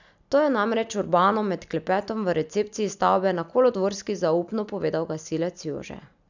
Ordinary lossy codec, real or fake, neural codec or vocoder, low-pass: none; real; none; 7.2 kHz